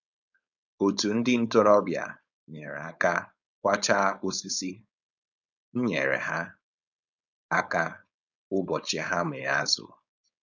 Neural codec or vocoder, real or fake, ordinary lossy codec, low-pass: codec, 16 kHz, 4.8 kbps, FACodec; fake; none; 7.2 kHz